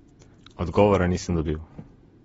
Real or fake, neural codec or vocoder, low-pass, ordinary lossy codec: fake; vocoder, 48 kHz, 128 mel bands, Vocos; 19.8 kHz; AAC, 24 kbps